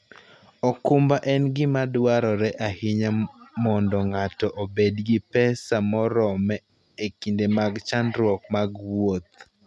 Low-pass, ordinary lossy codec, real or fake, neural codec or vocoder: none; none; real; none